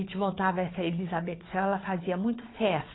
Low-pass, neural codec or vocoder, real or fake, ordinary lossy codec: 7.2 kHz; codec, 16 kHz, 4 kbps, FunCodec, trained on Chinese and English, 50 frames a second; fake; AAC, 16 kbps